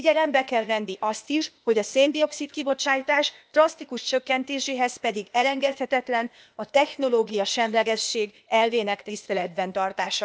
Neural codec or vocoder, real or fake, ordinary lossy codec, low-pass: codec, 16 kHz, 0.8 kbps, ZipCodec; fake; none; none